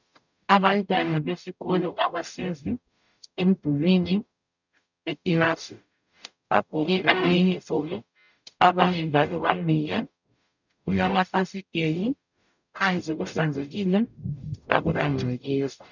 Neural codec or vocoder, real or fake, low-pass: codec, 44.1 kHz, 0.9 kbps, DAC; fake; 7.2 kHz